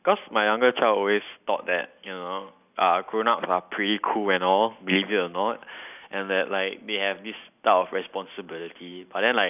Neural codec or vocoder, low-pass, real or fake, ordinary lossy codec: none; 3.6 kHz; real; none